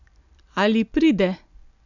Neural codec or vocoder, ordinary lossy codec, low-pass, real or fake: none; none; 7.2 kHz; real